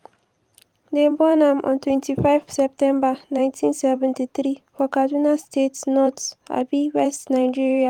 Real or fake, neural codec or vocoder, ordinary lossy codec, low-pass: fake; vocoder, 44.1 kHz, 128 mel bands every 512 samples, BigVGAN v2; Opus, 32 kbps; 19.8 kHz